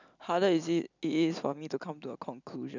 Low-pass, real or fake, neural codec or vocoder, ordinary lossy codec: 7.2 kHz; real; none; none